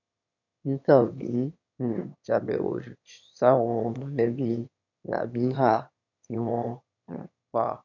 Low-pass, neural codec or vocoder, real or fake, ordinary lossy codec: 7.2 kHz; autoencoder, 22.05 kHz, a latent of 192 numbers a frame, VITS, trained on one speaker; fake; none